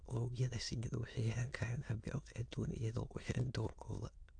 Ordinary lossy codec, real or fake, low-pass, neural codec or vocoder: AAC, 64 kbps; fake; 9.9 kHz; autoencoder, 22.05 kHz, a latent of 192 numbers a frame, VITS, trained on many speakers